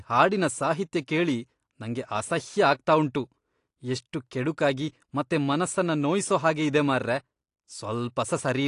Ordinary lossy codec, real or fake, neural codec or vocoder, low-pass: AAC, 48 kbps; real; none; 10.8 kHz